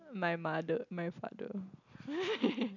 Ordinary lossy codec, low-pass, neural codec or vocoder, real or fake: none; 7.2 kHz; none; real